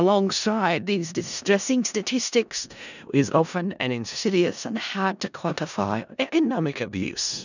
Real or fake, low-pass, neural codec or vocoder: fake; 7.2 kHz; codec, 16 kHz in and 24 kHz out, 0.4 kbps, LongCat-Audio-Codec, four codebook decoder